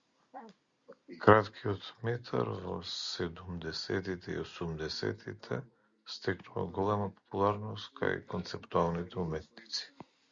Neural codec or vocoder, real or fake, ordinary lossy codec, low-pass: none; real; Opus, 64 kbps; 7.2 kHz